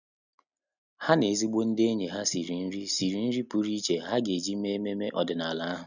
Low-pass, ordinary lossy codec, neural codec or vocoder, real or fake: 7.2 kHz; none; none; real